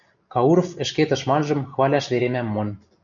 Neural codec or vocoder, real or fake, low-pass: none; real; 7.2 kHz